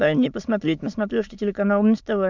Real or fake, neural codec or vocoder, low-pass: fake; autoencoder, 22.05 kHz, a latent of 192 numbers a frame, VITS, trained on many speakers; 7.2 kHz